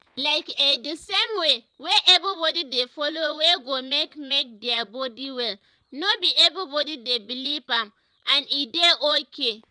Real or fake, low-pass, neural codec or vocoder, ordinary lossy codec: fake; 9.9 kHz; vocoder, 22.05 kHz, 80 mel bands, Vocos; none